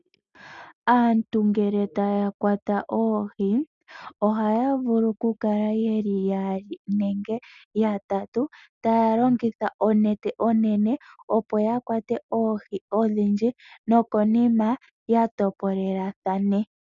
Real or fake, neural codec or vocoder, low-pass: real; none; 7.2 kHz